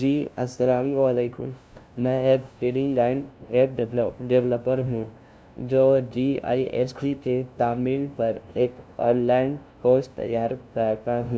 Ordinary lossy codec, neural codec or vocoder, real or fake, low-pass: none; codec, 16 kHz, 0.5 kbps, FunCodec, trained on LibriTTS, 25 frames a second; fake; none